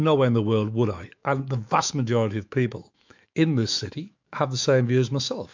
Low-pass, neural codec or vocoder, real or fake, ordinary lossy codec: 7.2 kHz; autoencoder, 48 kHz, 128 numbers a frame, DAC-VAE, trained on Japanese speech; fake; MP3, 64 kbps